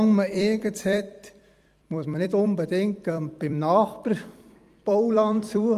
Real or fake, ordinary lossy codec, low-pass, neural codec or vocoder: fake; Opus, 32 kbps; 14.4 kHz; vocoder, 44.1 kHz, 128 mel bands every 256 samples, BigVGAN v2